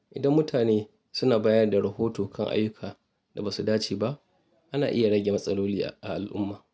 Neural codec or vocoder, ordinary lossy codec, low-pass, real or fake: none; none; none; real